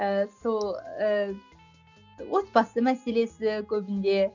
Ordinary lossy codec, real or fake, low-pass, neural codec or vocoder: none; real; 7.2 kHz; none